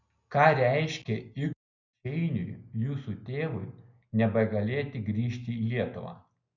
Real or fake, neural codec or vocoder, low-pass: real; none; 7.2 kHz